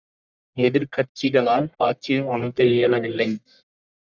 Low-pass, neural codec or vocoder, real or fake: 7.2 kHz; codec, 44.1 kHz, 1.7 kbps, Pupu-Codec; fake